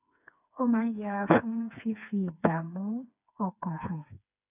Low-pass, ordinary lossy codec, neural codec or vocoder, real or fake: 3.6 kHz; none; codec, 16 kHz, 4 kbps, FreqCodec, smaller model; fake